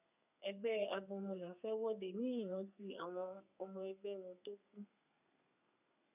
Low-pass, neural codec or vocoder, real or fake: 3.6 kHz; codec, 32 kHz, 1.9 kbps, SNAC; fake